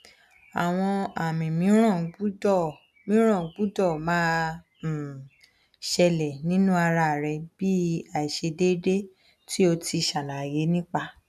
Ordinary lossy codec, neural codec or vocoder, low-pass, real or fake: none; none; 14.4 kHz; real